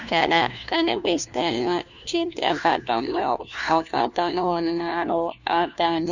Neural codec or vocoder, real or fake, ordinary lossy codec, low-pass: codec, 16 kHz, 1 kbps, FunCodec, trained on LibriTTS, 50 frames a second; fake; none; 7.2 kHz